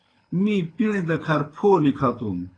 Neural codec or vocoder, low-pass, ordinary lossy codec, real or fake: codec, 24 kHz, 6 kbps, HILCodec; 9.9 kHz; AAC, 32 kbps; fake